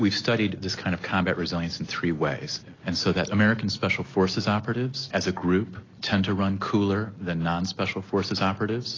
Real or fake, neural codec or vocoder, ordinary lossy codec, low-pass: real; none; AAC, 32 kbps; 7.2 kHz